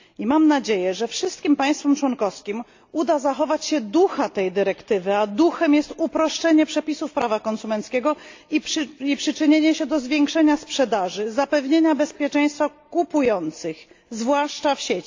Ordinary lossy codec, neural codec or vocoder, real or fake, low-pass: AAC, 48 kbps; none; real; 7.2 kHz